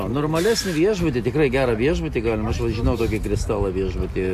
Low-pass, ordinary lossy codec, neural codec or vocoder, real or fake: 14.4 kHz; AAC, 64 kbps; none; real